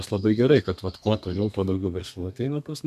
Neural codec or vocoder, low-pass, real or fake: codec, 32 kHz, 1.9 kbps, SNAC; 14.4 kHz; fake